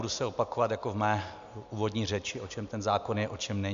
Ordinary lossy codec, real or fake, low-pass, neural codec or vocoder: MP3, 64 kbps; real; 7.2 kHz; none